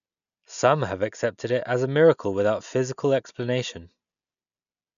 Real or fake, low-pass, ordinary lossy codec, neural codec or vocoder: real; 7.2 kHz; none; none